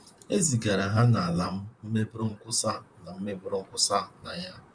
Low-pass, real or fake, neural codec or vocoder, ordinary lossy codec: 9.9 kHz; fake; vocoder, 44.1 kHz, 128 mel bands, Pupu-Vocoder; none